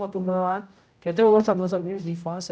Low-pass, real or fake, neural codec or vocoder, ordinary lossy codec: none; fake; codec, 16 kHz, 0.5 kbps, X-Codec, HuBERT features, trained on general audio; none